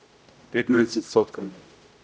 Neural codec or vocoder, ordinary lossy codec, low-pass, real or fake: codec, 16 kHz, 0.5 kbps, X-Codec, HuBERT features, trained on general audio; none; none; fake